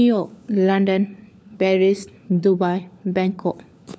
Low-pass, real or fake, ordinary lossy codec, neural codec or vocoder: none; fake; none; codec, 16 kHz, 4 kbps, FunCodec, trained on LibriTTS, 50 frames a second